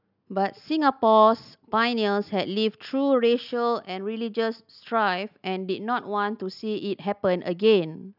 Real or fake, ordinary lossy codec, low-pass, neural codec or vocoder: real; none; 5.4 kHz; none